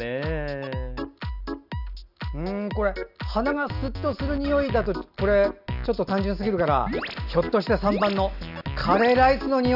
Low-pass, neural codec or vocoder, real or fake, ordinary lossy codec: 5.4 kHz; none; real; none